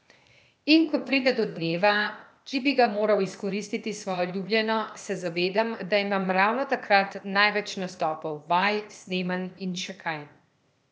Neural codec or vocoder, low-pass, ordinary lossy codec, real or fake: codec, 16 kHz, 0.8 kbps, ZipCodec; none; none; fake